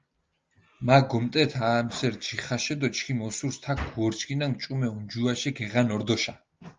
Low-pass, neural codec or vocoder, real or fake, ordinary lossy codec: 7.2 kHz; none; real; Opus, 32 kbps